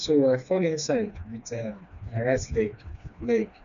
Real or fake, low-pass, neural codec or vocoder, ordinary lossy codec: fake; 7.2 kHz; codec, 16 kHz, 2 kbps, FreqCodec, smaller model; none